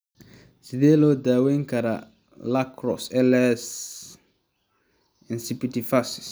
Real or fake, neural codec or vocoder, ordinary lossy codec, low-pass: real; none; none; none